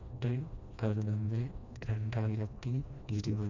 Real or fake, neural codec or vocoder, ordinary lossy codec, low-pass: fake; codec, 16 kHz, 1 kbps, FreqCodec, smaller model; none; 7.2 kHz